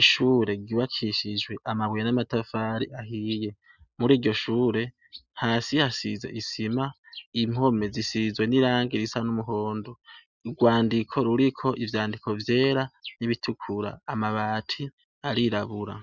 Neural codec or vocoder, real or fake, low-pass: none; real; 7.2 kHz